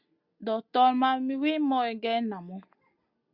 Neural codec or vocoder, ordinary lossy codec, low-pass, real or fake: none; Opus, 64 kbps; 5.4 kHz; real